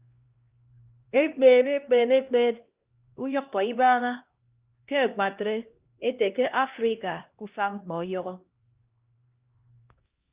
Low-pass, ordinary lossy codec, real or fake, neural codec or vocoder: 3.6 kHz; Opus, 24 kbps; fake; codec, 16 kHz, 1 kbps, X-Codec, HuBERT features, trained on LibriSpeech